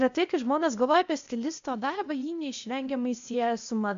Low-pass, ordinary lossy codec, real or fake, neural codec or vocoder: 7.2 kHz; MP3, 64 kbps; fake; codec, 16 kHz, 0.8 kbps, ZipCodec